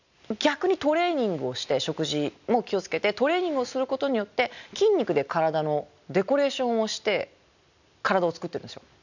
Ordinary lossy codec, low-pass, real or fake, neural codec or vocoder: none; 7.2 kHz; real; none